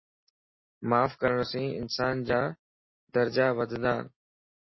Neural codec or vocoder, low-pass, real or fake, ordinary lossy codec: none; 7.2 kHz; real; MP3, 24 kbps